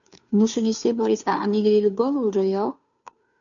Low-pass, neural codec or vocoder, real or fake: 7.2 kHz; codec, 16 kHz, 2 kbps, FunCodec, trained on Chinese and English, 25 frames a second; fake